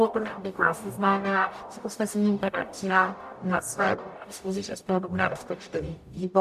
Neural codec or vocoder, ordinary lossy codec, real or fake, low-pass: codec, 44.1 kHz, 0.9 kbps, DAC; AAC, 96 kbps; fake; 14.4 kHz